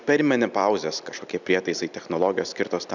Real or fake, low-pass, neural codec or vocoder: real; 7.2 kHz; none